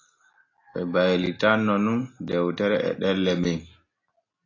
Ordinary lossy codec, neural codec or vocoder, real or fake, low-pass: AAC, 32 kbps; none; real; 7.2 kHz